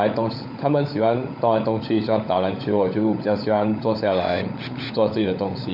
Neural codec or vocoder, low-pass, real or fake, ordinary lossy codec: codec, 16 kHz, 16 kbps, FunCodec, trained on LibriTTS, 50 frames a second; 5.4 kHz; fake; none